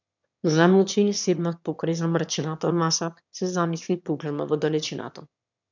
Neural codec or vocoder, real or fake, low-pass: autoencoder, 22.05 kHz, a latent of 192 numbers a frame, VITS, trained on one speaker; fake; 7.2 kHz